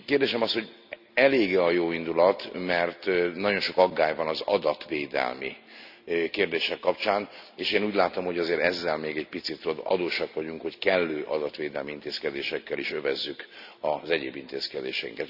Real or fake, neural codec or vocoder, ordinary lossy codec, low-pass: real; none; none; 5.4 kHz